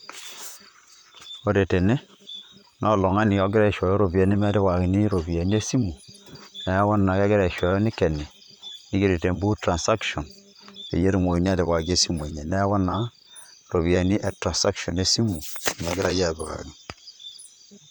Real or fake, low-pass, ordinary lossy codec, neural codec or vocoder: fake; none; none; vocoder, 44.1 kHz, 128 mel bands, Pupu-Vocoder